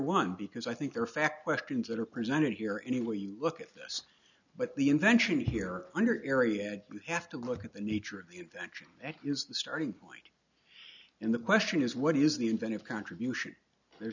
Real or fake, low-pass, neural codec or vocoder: real; 7.2 kHz; none